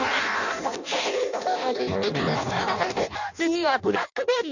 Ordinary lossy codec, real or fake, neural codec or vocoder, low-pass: none; fake; codec, 16 kHz in and 24 kHz out, 0.6 kbps, FireRedTTS-2 codec; 7.2 kHz